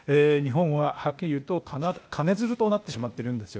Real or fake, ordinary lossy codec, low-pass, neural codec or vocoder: fake; none; none; codec, 16 kHz, 0.8 kbps, ZipCodec